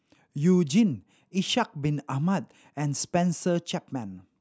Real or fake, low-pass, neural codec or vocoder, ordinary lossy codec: real; none; none; none